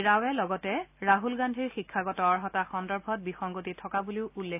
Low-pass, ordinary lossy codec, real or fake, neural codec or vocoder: 3.6 kHz; AAC, 32 kbps; real; none